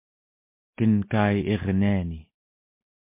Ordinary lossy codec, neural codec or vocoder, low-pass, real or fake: MP3, 24 kbps; none; 3.6 kHz; real